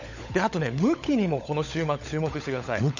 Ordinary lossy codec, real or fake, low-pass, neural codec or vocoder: none; fake; 7.2 kHz; codec, 16 kHz, 16 kbps, FunCodec, trained on LibriTTS, 50 frames a second